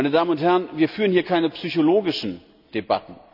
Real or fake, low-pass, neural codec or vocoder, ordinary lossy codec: real; 5.4 kHz; none; none